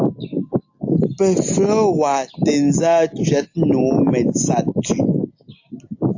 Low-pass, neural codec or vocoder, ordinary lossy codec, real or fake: 7.2 kHz; none; AAC, 48 kbps; real